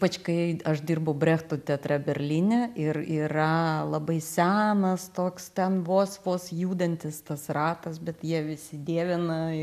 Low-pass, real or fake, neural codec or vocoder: 14.4 kHz; real; none